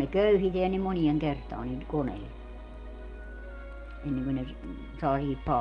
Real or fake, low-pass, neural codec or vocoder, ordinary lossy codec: real; 9.9 kHz; none; Opus, 32 kbps